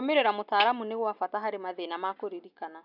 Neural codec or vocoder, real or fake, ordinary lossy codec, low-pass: none; real; none; 5.4 kHz